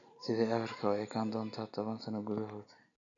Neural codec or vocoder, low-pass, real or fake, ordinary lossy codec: none; 7.2 kHz; real; none